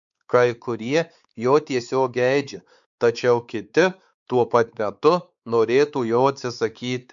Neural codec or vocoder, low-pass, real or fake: codec, 16 kHz, 4 kbps, X-Codec, WavLM features, trained on Multilingual LibriSpeech; 7.2 kHz; fake